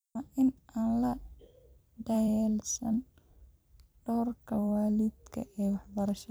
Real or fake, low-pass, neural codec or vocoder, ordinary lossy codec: fake; none; vocoder, 44.1 kHz, 128 mel bands every 256 samples, BigVGAN v2; none